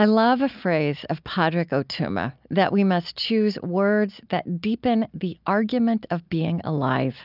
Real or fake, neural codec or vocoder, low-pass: real; none; 5.4 kHz